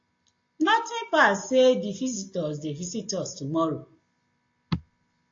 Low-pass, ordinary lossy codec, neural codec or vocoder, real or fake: 7.2 kHz; AAC, 48 kbps; none; real